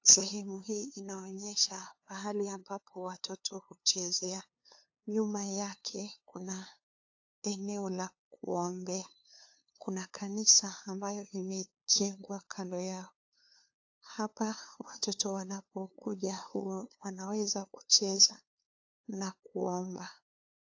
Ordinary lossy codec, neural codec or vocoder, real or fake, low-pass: AAC, 48 kbps; codec, 16 kHz, 2 kbps, FunCodec, trained on LibriTTS, 25 frames a second; fake; 7.2 kHz